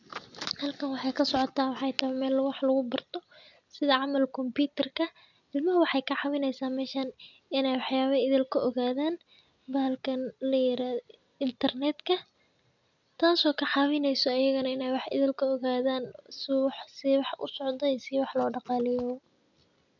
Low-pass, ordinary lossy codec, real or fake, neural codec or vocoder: 7.2 kHz; none; real; none